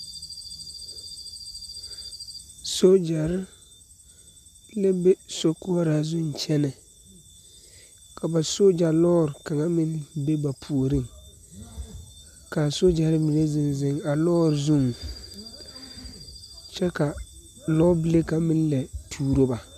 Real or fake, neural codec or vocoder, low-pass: real; none; 14.4 kHz